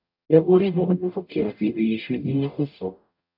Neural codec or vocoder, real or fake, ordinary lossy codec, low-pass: codec, 44.1 kHz, 0.9 kbps, DAC; fake; AAC, 48 kbps; 5.4 kHz